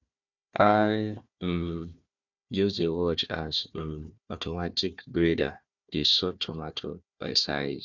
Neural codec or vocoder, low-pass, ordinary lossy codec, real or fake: codec, 16 kHz, 1 kbps, FunCodec, trained on Chinese and English, 50 frames a second; 7.2 kHz; none; fake